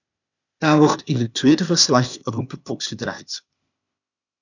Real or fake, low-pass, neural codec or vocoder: fake; 7.2 kHz; codec, 16 kHz, 0.8 kbps, ZipCodec